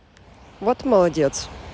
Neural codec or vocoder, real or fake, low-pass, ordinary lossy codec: none; real; none; none